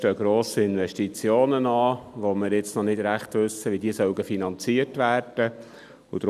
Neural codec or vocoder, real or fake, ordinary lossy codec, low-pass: none; real; none; 14.4 kHz